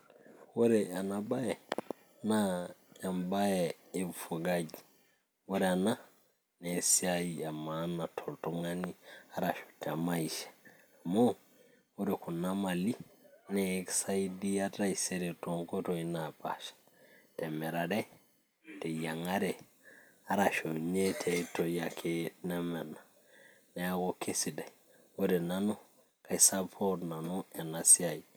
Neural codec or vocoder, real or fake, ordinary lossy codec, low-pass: none; real; none; none